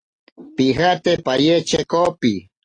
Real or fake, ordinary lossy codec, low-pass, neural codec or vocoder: real; AAC, 32 kbps; 9.9 kHz; none